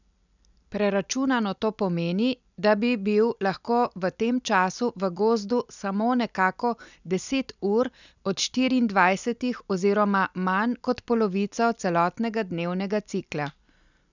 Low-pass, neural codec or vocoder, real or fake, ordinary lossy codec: 7.2 kHz; none; real; none